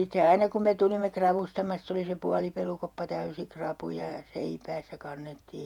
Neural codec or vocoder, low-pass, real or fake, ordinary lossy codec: vocoder, 48 kHz, 128 mel bands, Vocos; 19.8 kHz; fake; none